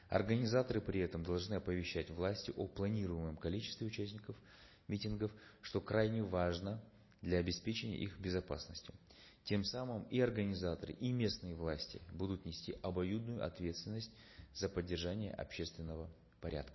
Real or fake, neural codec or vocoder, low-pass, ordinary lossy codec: real; none; 7.2 kHz; MP3, 24 kbps